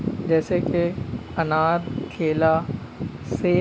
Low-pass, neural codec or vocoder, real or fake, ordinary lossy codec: none; none; real; none